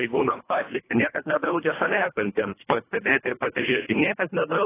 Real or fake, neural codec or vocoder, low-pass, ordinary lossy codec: fake; codec, 24 kHz, 1.5 kbps, HILCodec; 3.6 kHz; AAC, 16 kbps